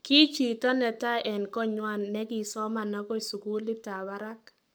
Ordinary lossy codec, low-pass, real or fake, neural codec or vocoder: none; none; fake; codec, 44.1 kHz, 7.8 kbps, Pupu-Codec